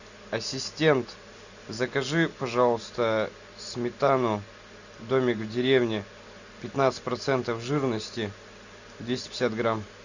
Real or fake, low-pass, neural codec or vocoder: real; 7.2 kHz; none